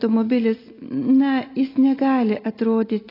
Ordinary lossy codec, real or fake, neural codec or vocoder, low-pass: AAC, 24 kbps; real; none; 5.4 kHz